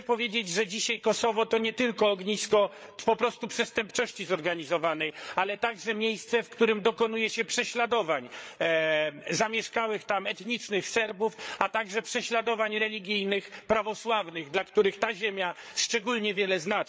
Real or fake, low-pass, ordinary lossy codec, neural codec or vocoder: fake; none; none; codec, 16 kHz, 8 kbps, FreqCodec, larger model